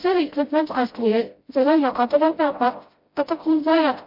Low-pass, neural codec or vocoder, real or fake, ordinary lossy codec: 5.4 kHz; codec, 16 kHz, 0.5 kbps, FreqCodec, smaller model; fake; MP3, 32 kbps